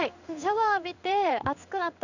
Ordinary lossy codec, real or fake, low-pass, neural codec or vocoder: none; fake; 7.2 kHz; codec, 16 kHz in and 24 kHz out, 1 kbps, XY-Tokenizer